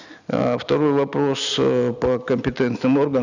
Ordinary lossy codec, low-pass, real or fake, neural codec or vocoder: none; 7.2 kHz; real; none